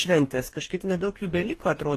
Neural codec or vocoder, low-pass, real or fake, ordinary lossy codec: codec, 44.1 kHz, 2.6 kbps, DAC; 14.4 kHz; fake; AAC, 48 kbps